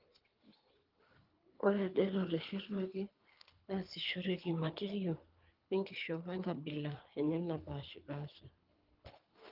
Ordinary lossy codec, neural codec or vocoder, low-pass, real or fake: Opus, 32 kbps; codec, 24 kHz, 3 kbps, HILCodec; 5.4 kHz; fake